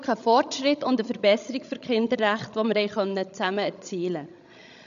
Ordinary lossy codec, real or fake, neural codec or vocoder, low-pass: none; fake; codec, 16 kHz, 16 kbps, FreqCodec, larger model; 7.2 kHz